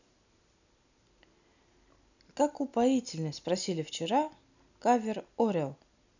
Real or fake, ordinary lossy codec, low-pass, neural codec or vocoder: real; none; 7.2 kHz; none